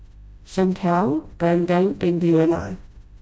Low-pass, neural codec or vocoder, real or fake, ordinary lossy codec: none; codec, 16 kHz, 0.5 kbps, FreqCodec, smaller model; fake; none